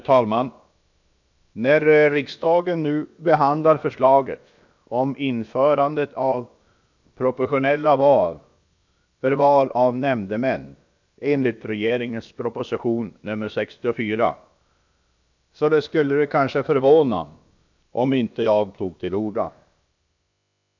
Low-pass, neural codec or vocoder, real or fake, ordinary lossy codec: 7.2 kHz; codec, 16 kHz, about 1 kbps, DyCAST, with the encoder's durations; fake; MP3, 64 kbps